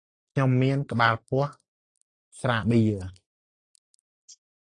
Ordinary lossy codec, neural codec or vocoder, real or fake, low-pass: AAC, 48 kbps; none; real; 9.9 kHz